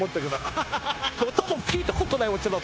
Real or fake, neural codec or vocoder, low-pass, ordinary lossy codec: fake; codec, 16 kHz, 0.9 kbps, LongCat-Audio-Codec; none; none